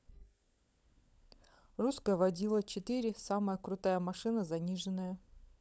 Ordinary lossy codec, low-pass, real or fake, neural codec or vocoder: none; none; fake; codec, 16 kHz, 16 kbps, FunCodec, trained on LibriTTS, 50 frames a second